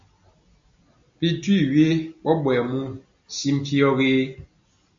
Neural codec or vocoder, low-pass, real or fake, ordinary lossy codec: none; 7.2 kHz; real; AAC, 64 kbps